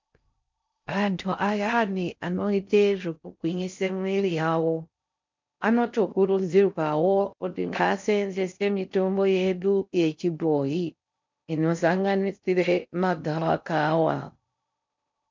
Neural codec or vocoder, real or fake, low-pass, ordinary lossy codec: codec, 16 kHz in and 24 kHz out, 0.6 kbps, FocalCodec, streaming, 4096 codes; fake; 7.2 kHz; MP3, 64 kbps